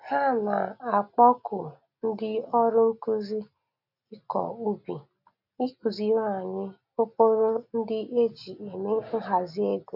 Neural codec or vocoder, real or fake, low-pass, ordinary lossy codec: none; real; 5.4 kHz; none